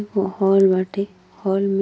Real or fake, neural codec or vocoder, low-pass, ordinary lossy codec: real; none; none; none